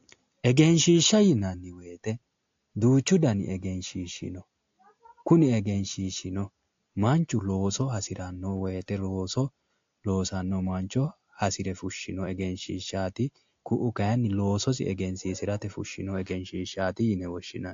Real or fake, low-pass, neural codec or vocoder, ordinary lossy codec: real; 7.2 kHz; none; AAC, 48 kbps